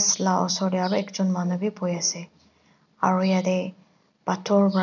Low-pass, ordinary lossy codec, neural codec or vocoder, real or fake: 7.2 kHz; none; none; real